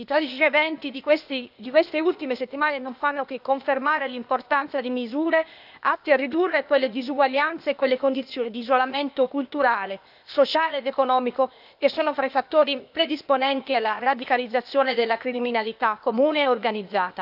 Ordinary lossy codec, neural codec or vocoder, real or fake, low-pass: none; codec, 16 kHz, 0.8 kbps, ZipCodec; fake; 5.4 kHz